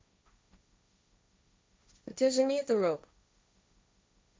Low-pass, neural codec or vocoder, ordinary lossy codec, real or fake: none; codec, 16 kHz, 1.1 kbps, Voila-Tokenizer; none; fake